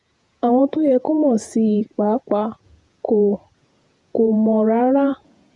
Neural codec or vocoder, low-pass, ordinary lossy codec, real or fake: vocoder, 48 kHz, 128 mel bands, Vocos; 10.8 kHz; none; fake